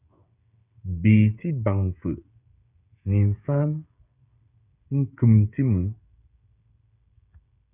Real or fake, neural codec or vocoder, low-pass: fake; codec, 16 kHz, 16 kbps, FreqCodec, smaller model; 3.6 kHz